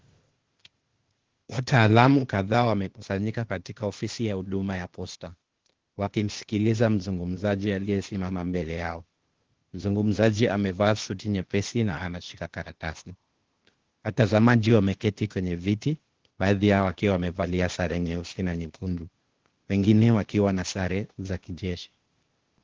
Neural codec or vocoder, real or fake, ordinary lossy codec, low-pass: codec, 16 kHz, 0.8 kbps, ZipCodec; fake; Opus, 16 kbps; 7.2 kHz